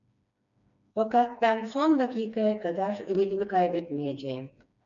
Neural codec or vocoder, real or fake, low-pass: codec, 16 kHz, 2 kbps, FreqCodec, smaller model; fake; 7.2 kHz